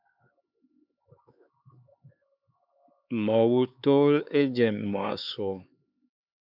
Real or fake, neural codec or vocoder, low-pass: fake; codec, 16 kHz, 4 kbps, X-Codec, HuBERT features, trained on LibriSpeech; 5.4 kHz